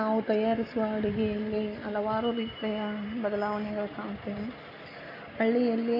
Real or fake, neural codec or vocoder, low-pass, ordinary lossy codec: real; none; 5.4 kHz; none